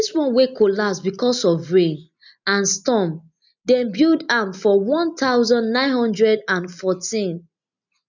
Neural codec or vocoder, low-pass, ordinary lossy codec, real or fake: none; 7.2 kHz; none; real